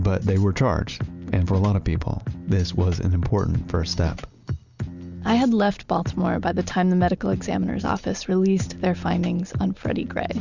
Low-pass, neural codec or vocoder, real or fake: 7.2 kHz; none; real